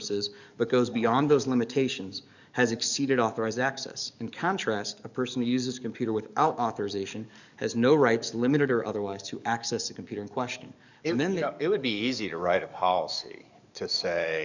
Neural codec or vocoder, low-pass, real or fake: codec, 44.1 kHz, 7.8 kbps, DAC; 7.2 kHz; fake